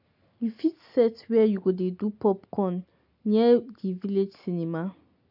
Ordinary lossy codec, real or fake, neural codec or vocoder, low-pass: none; real; none; 5.4 kHz